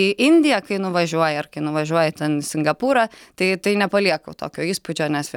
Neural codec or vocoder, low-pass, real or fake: none; 19.8 kHz; real